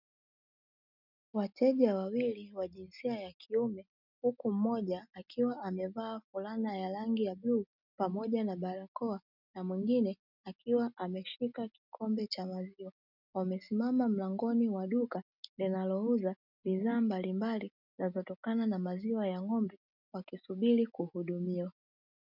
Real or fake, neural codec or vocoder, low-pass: real; none; 5.4 kHz